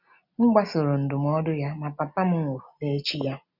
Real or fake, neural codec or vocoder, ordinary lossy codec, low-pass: real; none; none; 5.4 kHz